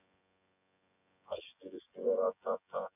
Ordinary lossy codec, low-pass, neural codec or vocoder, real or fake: none; 3.6 kHz; vocoder, 24 kHz, 100 mel bands, Vocos; fake